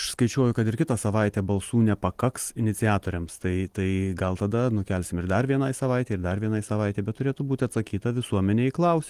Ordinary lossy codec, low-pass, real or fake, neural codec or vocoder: Opus, 24 kbps; 14.4 kHz; real; none